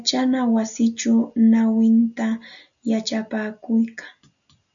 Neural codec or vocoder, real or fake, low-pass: none; real; 7.2 kHz